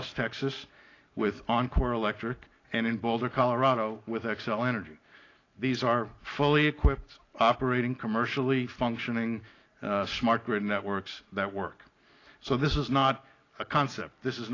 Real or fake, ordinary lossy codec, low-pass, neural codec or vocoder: real; AAC, 32 kbps; 7.2 kHz; none